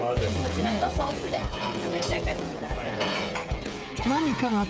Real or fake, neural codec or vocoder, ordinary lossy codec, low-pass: fake; codec, 16 kHz, 16 kbps, FreqCodec, smaller model; none; none